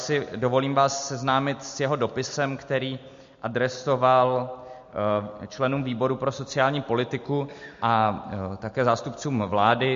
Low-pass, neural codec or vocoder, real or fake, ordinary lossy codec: 7.2 kHz; none; real; MP3, 48 kbps